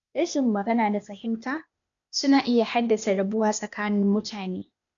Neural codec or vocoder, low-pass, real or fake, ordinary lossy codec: codec, 16 kHz, 0.8 kbps, ZipCodec; 7.2 kHz; fake; none